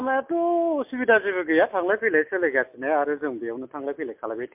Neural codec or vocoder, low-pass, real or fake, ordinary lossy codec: none; 3.6 kHz; real; MP3, 32 kbps